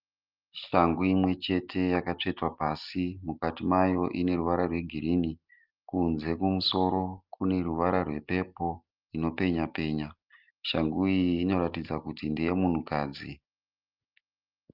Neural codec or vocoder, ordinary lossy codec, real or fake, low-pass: none; Opus, 32 kbps; real; 5.4 kHz